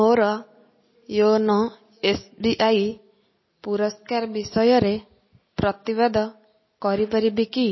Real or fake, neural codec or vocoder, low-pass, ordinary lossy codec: real; none; 7.2 kHz; MP3, 24 kbps